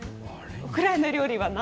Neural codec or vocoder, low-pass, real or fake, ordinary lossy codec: none; none; real; none